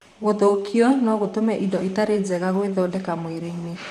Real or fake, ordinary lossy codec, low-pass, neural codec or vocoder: fake; none; 14.4 kHz; vocoder, 44.1 kHz, 128 mel bands, Pupu-Vocoder